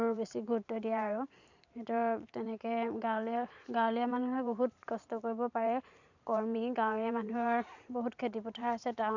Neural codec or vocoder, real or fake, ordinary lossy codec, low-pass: vocoder, 44.1 kHz, 128 mel bands every 512 samples, BigVGAN v2; fake; none; 7.2 kHz